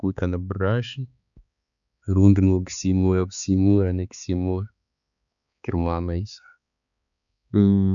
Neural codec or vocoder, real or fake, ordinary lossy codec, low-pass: codec, 16 kHz, 4 kbps, X-Codec, HuBERT features, trained on balanced general audio; fake; none; 7.2 kHz